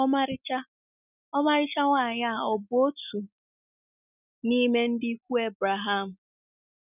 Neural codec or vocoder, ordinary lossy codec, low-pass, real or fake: none; none; 3.6 kHz; real